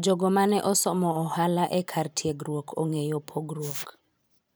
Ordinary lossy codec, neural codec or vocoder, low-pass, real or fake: none; vocoder, 44.1 kHz, 128 mel bands every 512 samples, BigVGAN v2; none; fake